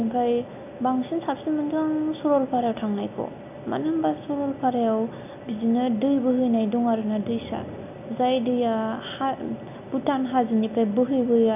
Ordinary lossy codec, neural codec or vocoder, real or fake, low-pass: none; none; real; 3.6 kHz